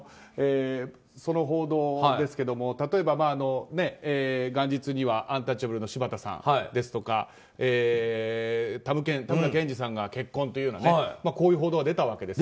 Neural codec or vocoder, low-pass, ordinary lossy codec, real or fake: none; none; none; real